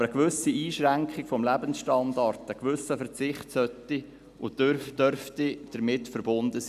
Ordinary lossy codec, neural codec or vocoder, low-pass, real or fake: none; none; 14.4 kHz; real